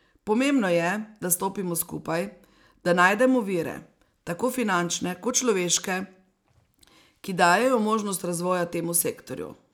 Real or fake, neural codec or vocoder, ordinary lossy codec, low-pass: real; none; none; none